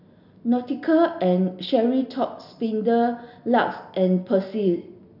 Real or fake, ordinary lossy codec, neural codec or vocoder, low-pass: real; none; none; 5.4 kHz